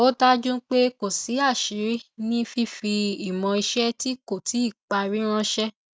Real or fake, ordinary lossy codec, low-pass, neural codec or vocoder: real; none; none; none